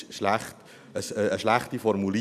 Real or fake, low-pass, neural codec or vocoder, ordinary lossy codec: real; 14.4 kHz; none; none